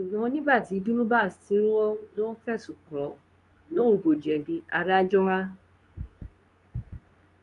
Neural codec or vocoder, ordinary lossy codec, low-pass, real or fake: codec, 24 kHz, 0.9 kbps, WavTokenizer, medium speech release version 2; none; 10.8 kHz; fake